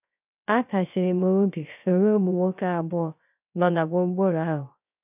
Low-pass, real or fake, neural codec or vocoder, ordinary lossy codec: 3.6 kHz; fake; codec, 16 kHz, 0.3 kbps, FocalCodec; none